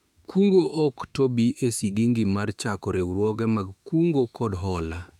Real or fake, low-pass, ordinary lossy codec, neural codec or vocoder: fake; 19.8 kHz; none; autoencoder, 48 kHz, 32 numbers a frame, DAC-VAE, trained on Japanese speech